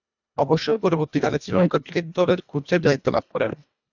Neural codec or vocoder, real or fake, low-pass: codec, 24 kHz, 1.5 kbps, HILCodec; fake; 7.2 kHz